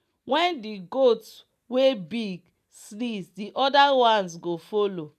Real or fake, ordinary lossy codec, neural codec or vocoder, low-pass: real; none; none; 14.4 kHz